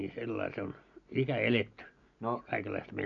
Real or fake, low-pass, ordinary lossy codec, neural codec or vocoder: real; 7.2 kHz; none; none